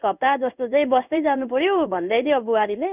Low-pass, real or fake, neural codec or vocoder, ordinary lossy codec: 3.6 kHz; fake; codec, 16 kHz in and 24 kHz out, 1 kbps, XY-Tokenizer; none